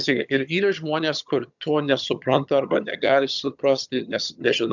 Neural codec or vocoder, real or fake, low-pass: vocoder, 22.05 kHz, 80 mel bands, HiFi-GAN; fake; 7.2 kHz